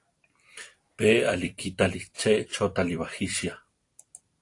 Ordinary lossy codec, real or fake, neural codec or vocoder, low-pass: AAC, 48 kbps; fake; vocoder, 44.1 kHz, 128 mel bands every 256 samples, BigVGAN v2; 10.8 kHz